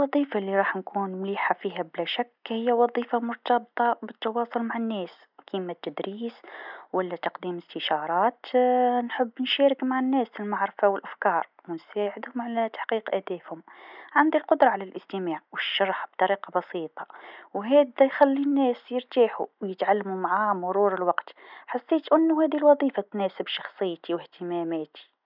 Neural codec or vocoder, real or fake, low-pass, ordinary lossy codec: none; real; 5.4 kHz; none